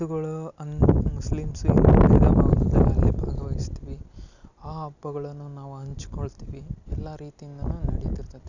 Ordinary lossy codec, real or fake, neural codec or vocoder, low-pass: none; real; none; 7.2 kHz